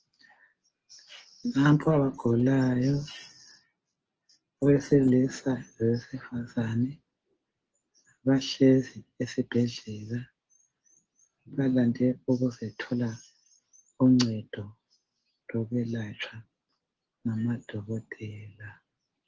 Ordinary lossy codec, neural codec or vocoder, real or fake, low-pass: Opus, 16 kbps; none; real; 7.2 kHz